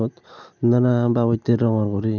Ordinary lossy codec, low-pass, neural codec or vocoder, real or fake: none; 7.2 kHz; none; real